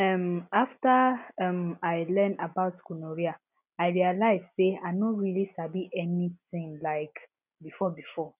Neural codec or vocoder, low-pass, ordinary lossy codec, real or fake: none; 3.6 kHz; none; real